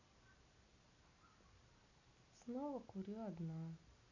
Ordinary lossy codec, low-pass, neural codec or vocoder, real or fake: none; 7.2 kHz; none; real